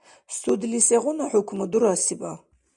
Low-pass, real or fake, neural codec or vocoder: 10.8 kHz; real; none